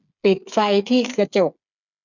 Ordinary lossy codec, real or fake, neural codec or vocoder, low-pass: none; fake; codec, 16 kHz, 8 kbps, FreqCodec, smaller model; 7.2 kHz